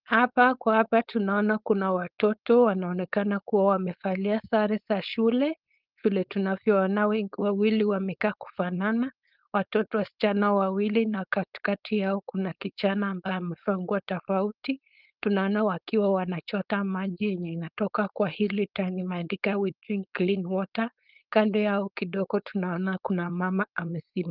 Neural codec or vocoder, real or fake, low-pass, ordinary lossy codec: codec, 16 kHz, 4.8 kbps, FACodec; fake; 5.4 kHz; Opus, 24 kbps